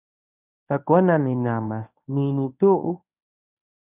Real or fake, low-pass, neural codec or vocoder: fake; 3.6 kHz; codec, 24 kHz, 0.9 kbps, WavTokenizer, medium speech release version 1